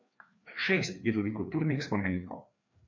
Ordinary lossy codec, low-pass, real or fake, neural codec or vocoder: MP3, 48 kbps; 7.2 kHz; fake; codec, 16 kHz, 2 kbps, FreqCodec, larger model